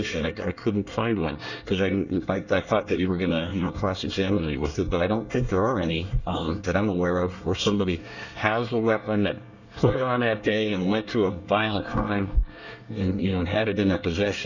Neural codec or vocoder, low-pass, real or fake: codec, 24 kHz, 1 kbps, SNAC; 7.2 kHz; fake